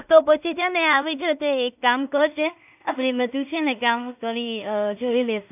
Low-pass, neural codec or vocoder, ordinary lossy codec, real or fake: 3.6 kHz; codec, 16 kHz in and 24 kHz out, 0.4 kbps, LongCat-Audio-Codec, two codebook decoder; none; fake